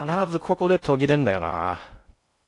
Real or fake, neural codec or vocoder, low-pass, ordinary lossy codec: fake; codec, 16 kHz in and 24 kHz out, 0.6 kbps, FocalCodec, streaming, 2048 codes; 10.8 kHz; AAC, 48 kbps